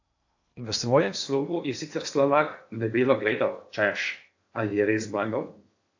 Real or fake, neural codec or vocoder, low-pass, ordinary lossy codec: fake; codec, 16 kHz in and 24 kHz out, 0.8 kbps, FocalCodec, streaming, 65536 codes; 7.2 kHz; AAC, 48 kbps